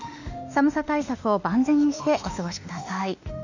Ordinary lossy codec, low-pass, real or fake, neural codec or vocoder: none; 7.2 kHz; fake; autoencoder, 48 kHz, 32 numbers a frame, DAC-VAE, trained on Japanese speech